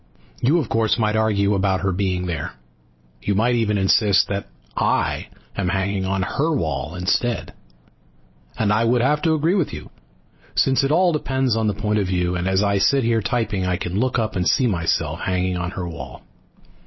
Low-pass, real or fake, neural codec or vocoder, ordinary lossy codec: 7.2 kHz; real; none; MP3, 24 kbps